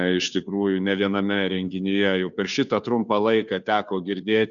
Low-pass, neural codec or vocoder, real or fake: 7.2 kHz; codec, 16 kHz, 2 kbps, FunCodec, trained on Chinese and English, 25 frames a second; fake